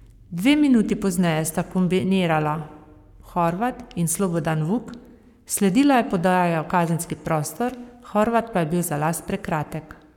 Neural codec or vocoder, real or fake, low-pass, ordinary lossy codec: codec, 44.1 kHz, 7.8 kbps, Pupu-Codec; fake; 19.8 kHz; none